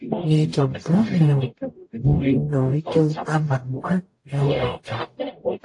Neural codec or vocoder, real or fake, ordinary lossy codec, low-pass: codec, 44.1 kHz, 0.9 kbps, DAC; fake; AAC, 48 kbps; 10.8 kHz